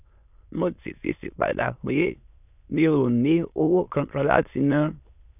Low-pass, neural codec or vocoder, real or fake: 3.6 kHz; autoencoder, 22.05 kHz, a latent of 192 numbers a frame, VITS, trained on many speakers; fake